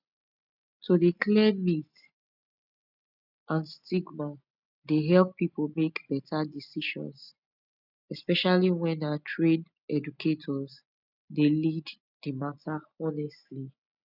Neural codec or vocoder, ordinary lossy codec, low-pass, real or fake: none; none; 5.4 kHz; real